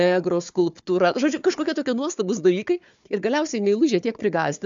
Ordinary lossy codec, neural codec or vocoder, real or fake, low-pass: MP3, 64 kbps; codec, 16 kHz, 4 kbps, FunCodec, trained on Chinese and English, 50 frames a second; fake; 7.2 kHz